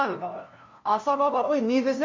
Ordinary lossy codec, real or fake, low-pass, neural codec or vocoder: MP3, 48 kbps; fake; 7.2 kHz; codec, 16 kHz, 0.5 kbps, FunCodec, trained on LibriTTS, 25 frames a second